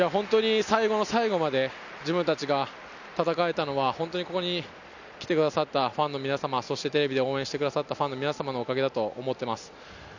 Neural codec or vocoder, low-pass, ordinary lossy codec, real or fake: none; 7.2 kHz; none; real